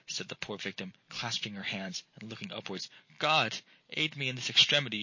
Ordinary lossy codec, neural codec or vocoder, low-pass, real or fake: MP3, 32 kbps; none; 7.2 kHz; real